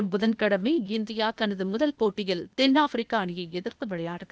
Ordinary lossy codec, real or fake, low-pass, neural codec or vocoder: none; fake; none; codec, 16 kHz, 0.8 kbps, ZipCodec